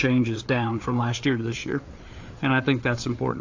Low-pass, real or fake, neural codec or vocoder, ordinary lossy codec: 7.2 kHz; fake; codec, 16 kHz, 4 kbps, FreqCodec, larger model; AAC, 48 kbps